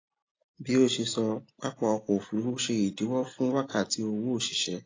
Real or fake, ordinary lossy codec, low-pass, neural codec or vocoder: real; AAC, 32 kbps; 7.2 kHz; none